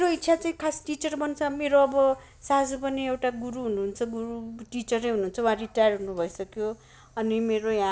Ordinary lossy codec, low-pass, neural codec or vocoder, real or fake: none; none; none; real